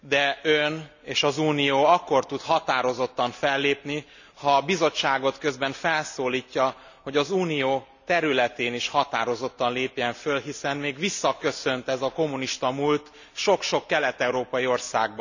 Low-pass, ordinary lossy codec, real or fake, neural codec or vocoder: 7.2 kHz; none; real; none